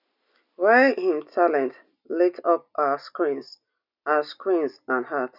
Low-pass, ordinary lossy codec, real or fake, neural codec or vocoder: 5.4 kHz; none; real; none